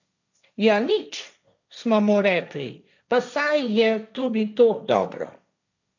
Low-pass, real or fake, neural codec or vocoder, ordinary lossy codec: 7.2 kHz; fake; codec, 16 kHz, 1.1 kbps, Voila-Tokenizer; none